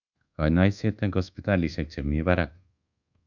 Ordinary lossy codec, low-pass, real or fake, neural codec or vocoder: none; 7.2 kHz; fake; codec, 24 kHz, 1.2 kbps, DualCodec